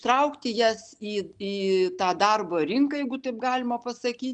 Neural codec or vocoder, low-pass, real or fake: none; 9.9 kHz; real